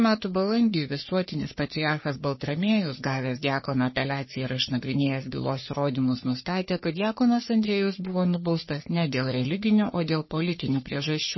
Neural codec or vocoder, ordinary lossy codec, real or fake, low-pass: codec, 44.1 kHz, 3.4 kbps, Pupu-Codec; MP3, 24 kbps; fake; 7.2 kHz